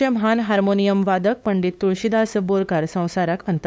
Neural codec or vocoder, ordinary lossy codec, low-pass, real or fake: codec, 16 kHz, 8 kbps, FunCodec, trained on LibriTTS, 25 frames a second; none; none; fake